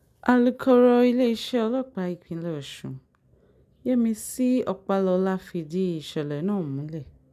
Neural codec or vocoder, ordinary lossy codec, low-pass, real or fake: none; none; 14.4 kHz; real